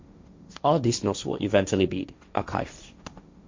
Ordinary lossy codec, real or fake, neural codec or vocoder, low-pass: MP3, 64 kbps; fake; codec, 16 kHz, 1.1 kbps, Voila-Tokenizer; 7.2 kHz